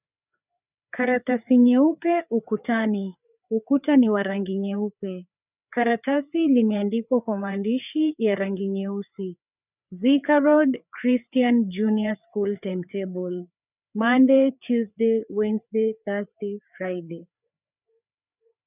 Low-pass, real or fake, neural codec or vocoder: 3.6 kHz; fake; codec, 16 kHz, 4 kbps, FreqCodec, larger model